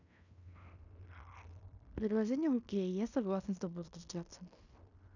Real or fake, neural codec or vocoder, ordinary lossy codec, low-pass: fake; codec, 16 kHz in and 24 kHz out, 0.9 kbps, LongCat-Audio-Codec, fine tuned four codebook decoder; none; 7.2 kHz